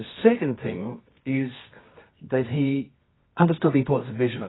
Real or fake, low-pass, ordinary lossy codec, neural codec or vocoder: fake; 7.2 kHz; AAC, 16 kbps; codec, 24 kHz, 0.9 kbps, WavTokenizer, medium music audio release